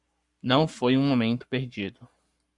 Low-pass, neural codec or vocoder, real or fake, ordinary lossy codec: 10.8 kHz; codec, 44.1 kHz, 7.8 kbps, Pupu-Codec; fake; MP3, 96 kbps